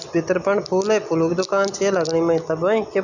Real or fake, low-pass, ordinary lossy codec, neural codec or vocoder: real; 7.2 kHz; none; none